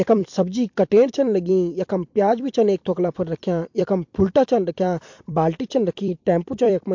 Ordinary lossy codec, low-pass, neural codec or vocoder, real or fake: MP3, 48 kbps; 7.2 kHz; vocoder, 44.1 kHz, 128 mel bands every 256 samples, BigVGAN v2; fake